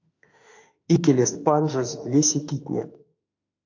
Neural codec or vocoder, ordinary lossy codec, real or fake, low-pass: autoencoder, 48 kHz, 32 numbers a frame, DAC-VAE, trained on Japanese speech; AAC, 48 kbps; fake; 7.2 kHz